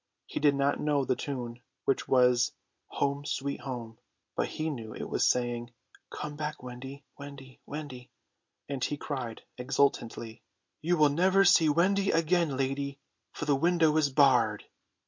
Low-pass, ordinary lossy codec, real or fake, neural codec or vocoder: 7.2 kHz; MP3, 48 kbps; real; none